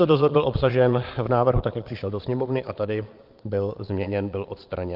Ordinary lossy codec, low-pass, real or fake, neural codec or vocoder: Opus, 32 kbps; 5.4 kHz; fake; vocoder, 22.05 kHz, 80 mel bands, Vocos